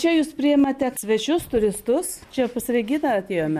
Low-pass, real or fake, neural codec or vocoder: 14.4 kHz; real; none